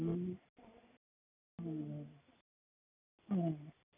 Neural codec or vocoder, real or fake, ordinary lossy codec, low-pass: none; real; none; 3.6 kHz